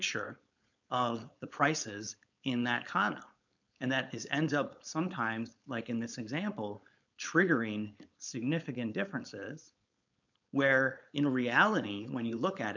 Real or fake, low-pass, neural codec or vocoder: fake; 7.2 kHz; codec, 16 kHz, 4.8 kbps, FACodec